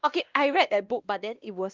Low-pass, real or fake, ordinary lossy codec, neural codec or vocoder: 7.2 kHz; fake; Opus, 24 kbps; codec, 16 kHz, 1 kbps, X-Codec, WavLM features, trained on Multilingual LibriSpeech